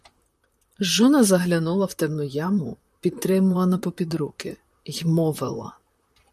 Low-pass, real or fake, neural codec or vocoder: 14.4 kHz; fake; vocoder, 44.1 kHz, 128 mel bands, Pupu-Vocoder